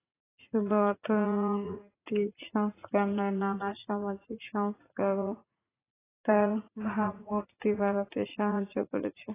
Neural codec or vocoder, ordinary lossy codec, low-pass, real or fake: vocoder, 44.1 kHz, 80 mel bands, Vocos; AAC, 16 kbps; 3.6 kHz; fake